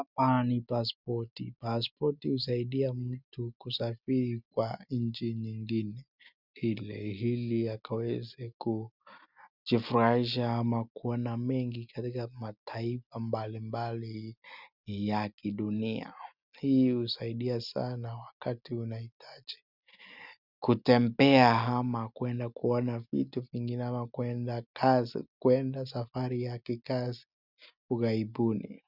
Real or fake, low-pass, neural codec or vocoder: real; 5.4 kHz; none